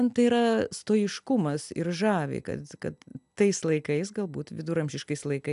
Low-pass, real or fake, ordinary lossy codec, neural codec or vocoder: 10.8 kHz; real; AAC, 96 kbps; none